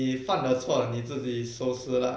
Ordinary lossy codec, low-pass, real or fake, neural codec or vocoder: none; none; real; none